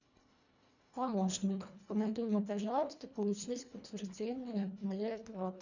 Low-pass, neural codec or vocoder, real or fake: 7.2 kHz; codec, 24 kHz, 1.5 kbps, HILCodec; fake